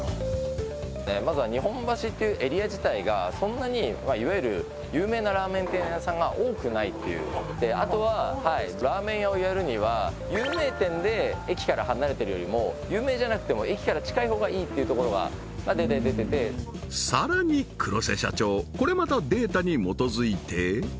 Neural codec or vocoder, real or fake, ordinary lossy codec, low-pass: none; real; none; none